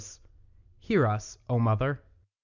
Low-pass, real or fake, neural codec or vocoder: 7.2 kHz; real; none